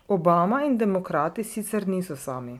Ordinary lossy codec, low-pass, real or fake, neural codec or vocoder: MP3, 96 kbps; 19.8 kHz; real; none